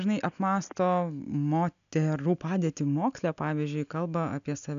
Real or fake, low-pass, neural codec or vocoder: real; 7.2 kHz; none